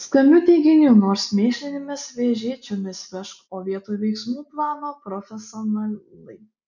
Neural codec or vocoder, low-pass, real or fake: none; 7.2 kHz; real